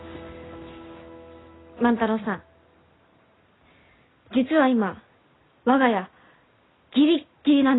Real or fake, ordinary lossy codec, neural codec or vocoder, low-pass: real; AAC, 16 kbps; none; 7.2 kHz